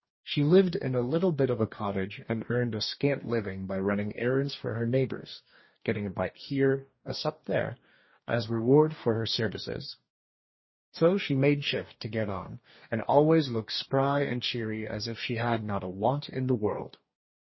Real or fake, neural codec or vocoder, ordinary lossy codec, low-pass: fake; codec, 44.1 kHz, 2.6 kbps, DAC; MP3, 24 kbps; 7.2 kHz